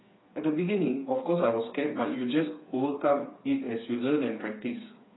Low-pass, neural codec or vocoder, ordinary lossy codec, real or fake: 7.2 kHz; codec, 16 kHz, 4 kbps, FreqCodec, smaller model; AAC, 16 kbps; fake